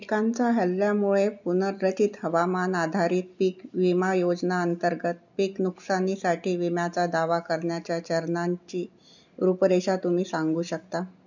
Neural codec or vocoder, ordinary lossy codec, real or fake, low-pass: none; none; real; 7.2 kHz